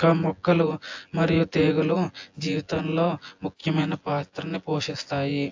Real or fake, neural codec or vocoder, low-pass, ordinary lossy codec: fake; vocoder, 24 kHz, 100 mel bands, Vocos; 7.2 kHz; AAC, 48 kbps